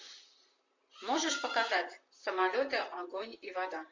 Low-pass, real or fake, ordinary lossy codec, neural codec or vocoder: 7.2 kHz; fake; MP3, 48 kbps; vocoder, 44.1 kHz, 128 mel bands, Pupu-Vocoder